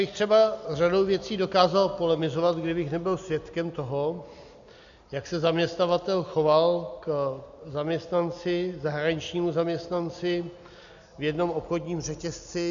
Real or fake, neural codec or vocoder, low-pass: real; none; 7.2 kHz